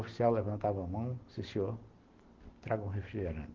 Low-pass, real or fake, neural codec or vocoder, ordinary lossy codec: 7.2 kHz; real; none; Opus, 16 kbps